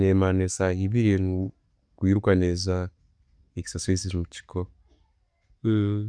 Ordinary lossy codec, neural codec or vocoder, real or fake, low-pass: Opus, 64 kbps; none; real; 9.9 kHz